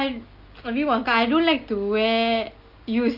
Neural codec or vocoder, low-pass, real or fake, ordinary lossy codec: none; 5.4 kHz; real; Opus, 32 kbps